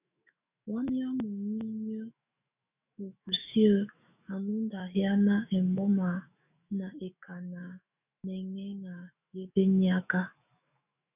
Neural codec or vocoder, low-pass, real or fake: autoencoder, 48 kHz, 128 numbers a frame, DAC-VAE, trained on Japanese speech; 3.6 kHz; fake